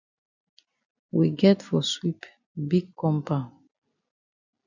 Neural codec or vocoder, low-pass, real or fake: none; 7.2 kHz; real